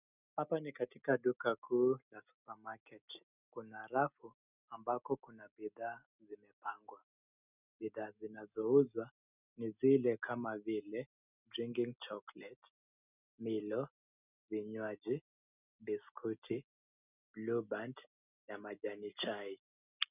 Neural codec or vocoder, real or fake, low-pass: none; real; 3.6 kHz